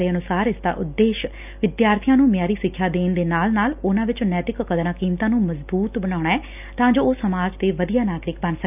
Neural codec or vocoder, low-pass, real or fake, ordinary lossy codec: none; 3.6 kHz; real; none